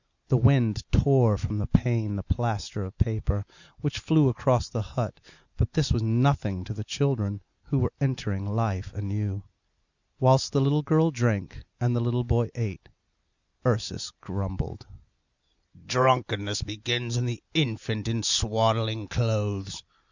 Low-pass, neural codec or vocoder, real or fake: 7.2 kHz; none; real